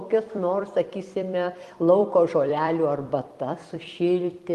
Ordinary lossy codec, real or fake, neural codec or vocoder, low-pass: Opus, 24 kbps; fake; vocoder, 44.1 kHz, 128 mel bands every 256 samples, BigVGAN v2; 14.4 kHz